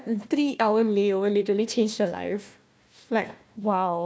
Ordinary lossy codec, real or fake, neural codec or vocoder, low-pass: none; fake; codec, 16 kHz, 1 kbps, FunCodec, trained on Chinese and English, 50 frames a second; none